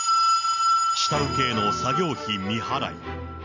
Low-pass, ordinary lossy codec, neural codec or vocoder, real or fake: 7.2 kHz; none; none; real